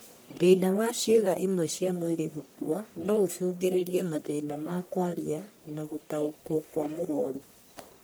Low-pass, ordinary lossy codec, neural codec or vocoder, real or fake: none; none; codec, 44.1 kHz, 1.7 kbps, Pupu-Codec; fake